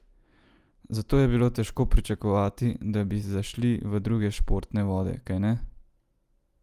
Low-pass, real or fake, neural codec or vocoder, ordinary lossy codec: 14.4 kHz; real; none; Opus, 32 kbps